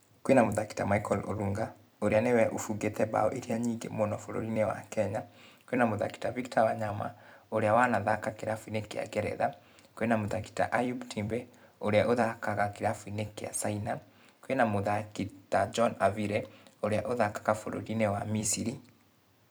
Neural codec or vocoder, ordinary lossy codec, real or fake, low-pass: vocoder, 44.1 kHz, 128 mel bands every 256 samples, BigVGAN v2; none; fake; none